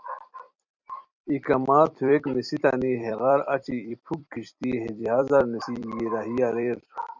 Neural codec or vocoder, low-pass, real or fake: vocoder, 44.1 kHz, 128 mel bands every 256 samples, BigVGAN v2; 7.2 kHz; fake